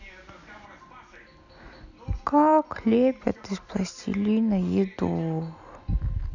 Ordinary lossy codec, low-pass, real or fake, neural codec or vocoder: none; 7.2 kHz; real; none